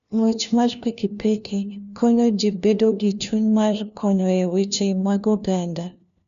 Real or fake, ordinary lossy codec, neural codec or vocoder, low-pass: fake; none; codec, 16 kHz, 1 kbps, FunCodec, trained on LibriTTS, 50 frames a second; 7.2 kHz